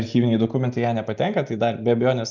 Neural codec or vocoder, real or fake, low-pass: none; real; 7.2 kHz